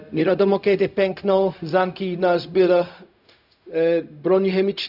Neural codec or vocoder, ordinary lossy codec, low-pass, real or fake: codec, 16 kHz, 0.4 kbps, LongCat-Audio-Codec; none; 5.4 kHz; fake